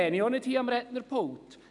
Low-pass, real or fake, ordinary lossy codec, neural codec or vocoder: 10.8 kHz; real; none; none